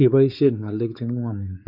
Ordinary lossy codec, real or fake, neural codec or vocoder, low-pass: none; fake; codec, 16 kHz, 4 kbps, X-Codec, WavLM features, trained on Multilingual LibriSpeech; 5.4 kHz